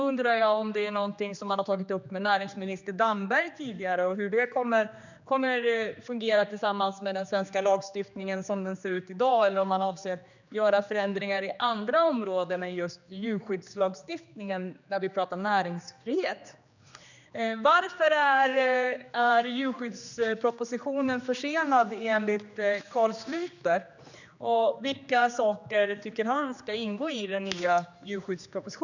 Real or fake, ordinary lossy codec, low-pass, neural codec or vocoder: fake; none; 7.2 kHz; codec, 16 kHz, 2 kbps, X-Codec, HuBERT features, trained on general audio